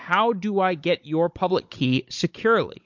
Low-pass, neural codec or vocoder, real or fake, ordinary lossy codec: 7.2 kHz; codec, 16 kHz, 16 kbps, FunCodec, trained on Chinese and English, 50 frames a second; fake; MP3, 48 kbps